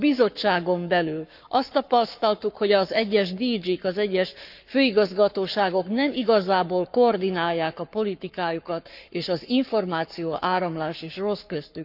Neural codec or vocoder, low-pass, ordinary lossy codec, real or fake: codec, 44.1 kHz, 7.8 kbps, Pupu-Codec; 5.4 kHz; none; fake